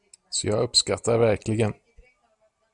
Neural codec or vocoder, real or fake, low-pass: none; real; 10.8 kHz